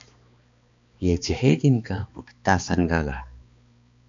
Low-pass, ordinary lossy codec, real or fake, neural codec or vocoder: 7.2 kHz; AAC, 64 kbps; fake; codec, 16 kHz, 2 kbps, X-Codec, HuBERT features, trained on balanced general audio